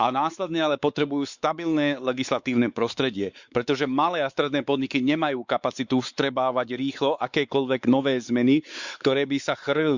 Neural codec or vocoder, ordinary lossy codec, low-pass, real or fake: codec, 16 kHz, 4 kbps, X-Codec, WavLM features, trained on Multilingual LibriSpeech; Opus, 64 kbps; 7.2 kHz; fake